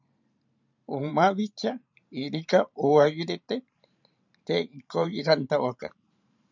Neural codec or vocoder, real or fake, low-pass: vocoder, 44.1 kHz, 128 mel bands every 512 samples, BigVGAN v2; fake; 7.2 kHz